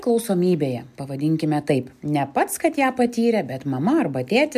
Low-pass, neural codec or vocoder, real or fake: 14.4 kHz; none; real